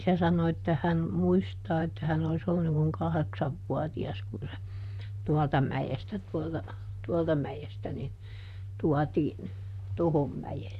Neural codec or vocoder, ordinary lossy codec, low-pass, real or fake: vocoder, 44.1 kHz, 128 mel bands, Pupu-Vocoder; none; 14.4 kHz; fake